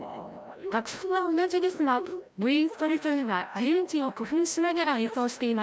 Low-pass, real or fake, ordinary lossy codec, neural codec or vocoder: none; fake; none; codec, 16 kHz, 0.5 kbps, FreqCodec, larger model